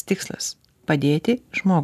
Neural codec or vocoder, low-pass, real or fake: none; 14.4 kHz; real